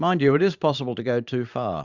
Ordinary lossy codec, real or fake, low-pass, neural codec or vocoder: Opus, 64 kbps; fake; 7.2 kHz; vocoder, 44.1 kHz, 128 mel bands every 512 samples, BigVGAN v2